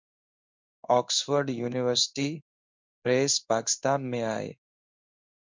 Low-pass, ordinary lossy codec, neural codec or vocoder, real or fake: 7.2 kHz; MP3, 64 kbps; codec, 16 kHz in and 24 kHz out, 1 kbps, XY-Tokenizer; fake